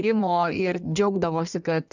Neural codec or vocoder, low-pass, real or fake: codec, 16 kHz in and 24 kHz out, 1.1 kbps, FireRedTTS-2 codec; 7.2 kHz; fake